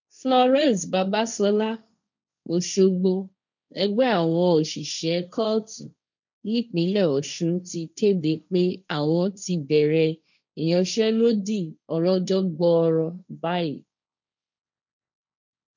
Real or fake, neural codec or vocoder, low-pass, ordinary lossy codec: fake; codec, 16 kHz, 1.1 kbps, Voila-Tokenizer; 7.2 kHz; none